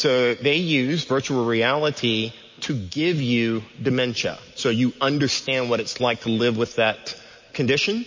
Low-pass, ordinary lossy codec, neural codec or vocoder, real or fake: 7.2 kHz; MP3, 32 kbps; none; real